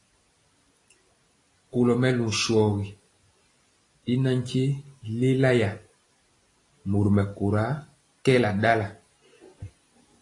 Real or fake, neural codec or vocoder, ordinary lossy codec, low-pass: real; none; AAC, 32 kbps; 10.8 kHz